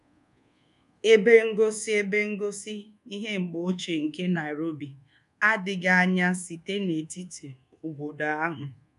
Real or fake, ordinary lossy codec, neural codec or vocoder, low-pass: fake; none; codec, 24 kHz, 1.2 kbps, DualCodec; 10.8 kHz